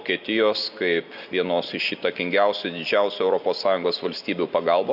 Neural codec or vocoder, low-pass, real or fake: none; 5.4 kHz; real